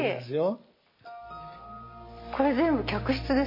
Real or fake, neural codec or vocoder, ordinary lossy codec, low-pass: real; none; MP3, 24 kbps; 5.4 kHz